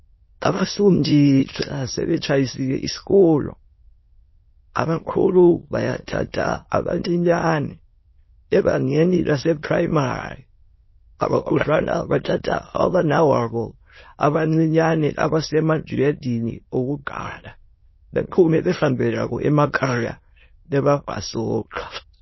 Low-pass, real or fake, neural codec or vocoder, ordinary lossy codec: 7.2 kHz; fake; autoencoder, 22.05 kHz, a latent of 192 numbers a frame, VITS, trained on many speakers; MP3, 24 kbps